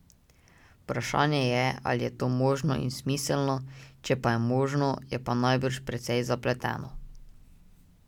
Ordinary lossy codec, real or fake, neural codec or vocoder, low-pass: none; real; none; 19.8 kHz